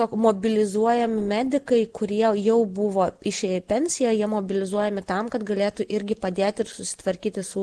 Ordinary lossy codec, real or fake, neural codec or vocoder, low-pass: Opus, 16 kbps; real; none; 10.8 kHz